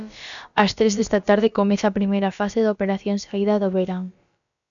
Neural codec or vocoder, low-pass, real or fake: codec, 16 kHz, about 1 kbps, DyCAST, with the encoder's durations; 7.2 kHz; fake